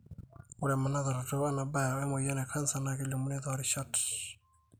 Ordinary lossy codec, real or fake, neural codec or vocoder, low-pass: none; real; none; none